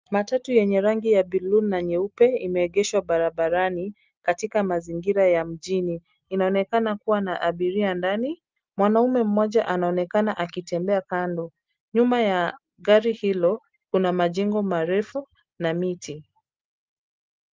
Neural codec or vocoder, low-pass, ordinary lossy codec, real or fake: none; 7.2 kHz; Opus, 32 kbps; real